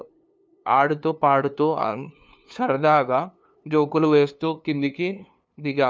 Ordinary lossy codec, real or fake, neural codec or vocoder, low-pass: none; fake; codec, 16 kHz, 2 kbps, FunCodec, trained on LibriTTS, 25 frames a second; none